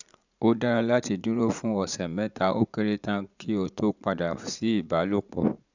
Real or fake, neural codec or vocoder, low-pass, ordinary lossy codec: fake; vocoder, 44.1 kHz, 80 mel bands, Vocos; 7.2 kHz; none